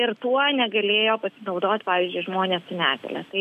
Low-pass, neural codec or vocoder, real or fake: 14.4 kHz; none; real